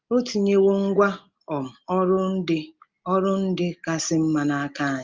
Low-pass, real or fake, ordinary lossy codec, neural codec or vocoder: 7.2 kHz; real; Opus, 32 kbps; none